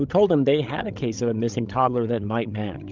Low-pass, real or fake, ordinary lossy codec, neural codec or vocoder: 7.2 kHz; fake; Opus, 32 kbps; codec, 16 kHz, 16 kbps, FreqCodec, larger model